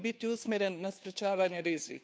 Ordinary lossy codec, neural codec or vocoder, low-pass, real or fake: none; codec, 16 kHz, 2 kbps, FunCodec, trained on Chinese and English, 25 frames a second; none; fake